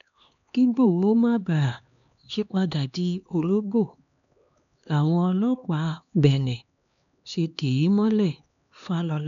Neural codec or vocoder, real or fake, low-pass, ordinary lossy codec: codec, 16 kHz, 2 kbps, X-Codec, HuBERT features, trained on LibriSpeech; fake; 7.2 kHz; none